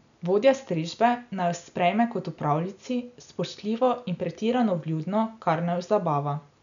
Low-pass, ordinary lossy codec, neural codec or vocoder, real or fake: 7.2 kHz; none; none; real